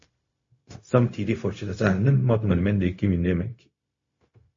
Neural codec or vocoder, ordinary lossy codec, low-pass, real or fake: codec, 16 kHz, 0.4 kbps, LongCat-Audio-Codec; MP3, 32 kbps; 7.2 kHz; fake